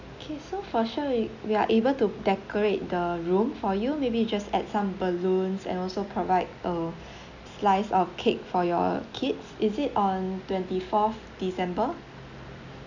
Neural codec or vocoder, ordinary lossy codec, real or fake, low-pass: none; none; real; 7.2 kHz